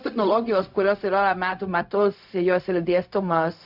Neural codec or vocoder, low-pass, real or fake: codec, 16 kHz, 0.4 kbps, LongCat-Audio-Codec; 5.4 kHz; fake